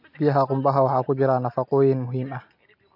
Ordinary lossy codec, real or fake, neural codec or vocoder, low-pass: MP3, 48 kbps; real; none; 5.4 kHz